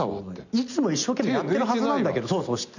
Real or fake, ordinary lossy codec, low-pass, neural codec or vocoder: real; none; 7.2 kHz; none